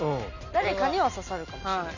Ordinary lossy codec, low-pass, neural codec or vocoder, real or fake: none; 7.2 kHz; none; real